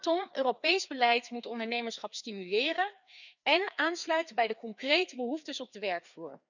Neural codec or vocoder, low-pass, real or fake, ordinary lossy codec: codec, 16 kHz, 2 kbps, FreqCodec, larger model; 7.2 kHz; fake; none